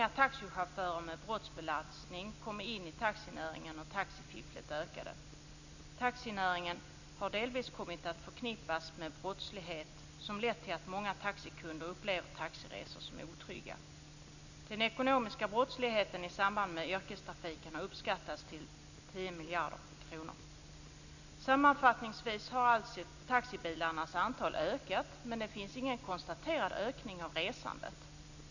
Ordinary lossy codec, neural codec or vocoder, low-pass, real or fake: none; none; 7.2 kHz; real